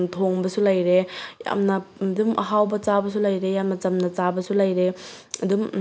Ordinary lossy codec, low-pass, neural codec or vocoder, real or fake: none; none; none; real